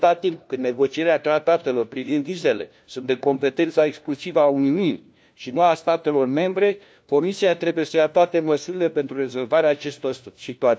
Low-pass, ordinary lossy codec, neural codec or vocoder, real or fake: none; none; codec, 16 kHz, 1 kbps, FunCodec, trained on LibriTTS, 50 frames a second; fake